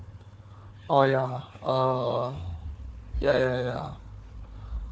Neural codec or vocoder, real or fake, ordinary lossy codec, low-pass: codec, 16 kHz, 4 kbps, FunCodec, trained on Chinese and English, 50 frames a second; fake; none; none